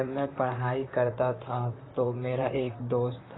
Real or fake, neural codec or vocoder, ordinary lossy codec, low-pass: fake; vocoder, 44.1 kHz, 128 mel bands, Pupu-Vocoder; AAC, 16 kbps; 7.2 kHz